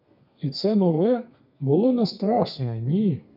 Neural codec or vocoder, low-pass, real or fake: codec, 32 kHz, 1.9 kbps, SNAC; 5.4 kHz; fake